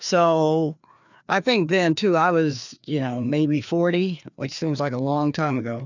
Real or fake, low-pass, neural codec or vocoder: fake; 7.2 kHz; codec, 16 kHz, 2 kbps, FreqCodec, larger model